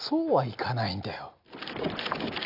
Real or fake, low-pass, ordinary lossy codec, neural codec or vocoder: real; 5.4 kHz; none; none